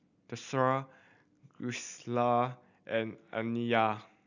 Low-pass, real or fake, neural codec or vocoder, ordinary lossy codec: 7.2 kHz; real; none; none